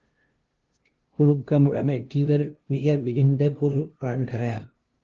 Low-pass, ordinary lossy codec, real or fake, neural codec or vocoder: 7.2 kHz; Opus, 16 kbps; fake; codec, 16 kHz, 0.5 kbps, FunCodec, trained on LibriTTS, 25 frames a second